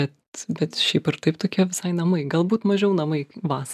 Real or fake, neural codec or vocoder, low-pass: real; none; 14.4 kHz